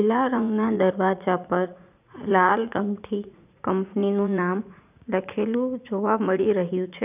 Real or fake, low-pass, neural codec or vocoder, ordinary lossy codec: fake; 3.6 kHz; vocoder, 44.1 kHz, 80 mel bands, Vocos; none